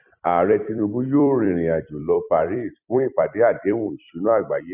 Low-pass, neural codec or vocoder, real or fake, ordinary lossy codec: 3.6 kHz; vocoder, 44.1 kHz, 128 mel bands every 256 samples, BigVGAN v2; fake; none